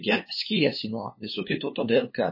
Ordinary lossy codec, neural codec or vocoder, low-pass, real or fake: MP3, 24 kbps; codec, 16 kHz, 2 kbps, FunCodec, trained on LibriTTS, 25 frames a second; 5.4 kHz; fake